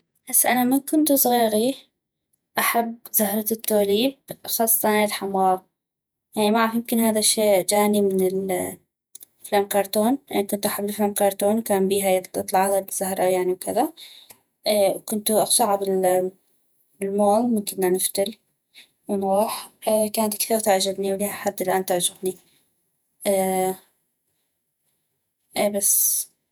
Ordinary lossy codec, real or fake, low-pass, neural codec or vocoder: none; fake; none; vocoder, 48 kHz, 128 mel bands, Vocos